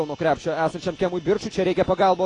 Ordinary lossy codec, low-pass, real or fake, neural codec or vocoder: AAC, 32 kbps; 9.9 kHz; real; none